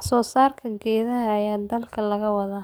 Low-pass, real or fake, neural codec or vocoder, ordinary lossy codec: none; fake; codec, 44.1 kHz, 7.8 kbps, DAC; none